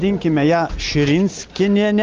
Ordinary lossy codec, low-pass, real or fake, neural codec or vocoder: Opus, 32 kbps; 7.2 kHz; real; none